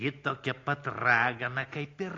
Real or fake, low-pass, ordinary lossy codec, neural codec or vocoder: real; 7.2 kHz; AAC, 32 kbps; none